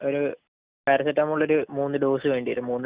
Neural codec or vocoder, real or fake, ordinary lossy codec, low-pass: none; real; Opus, 64 kbps; 3.6 kHz